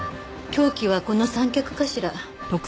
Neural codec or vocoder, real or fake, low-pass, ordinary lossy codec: none; real; none; none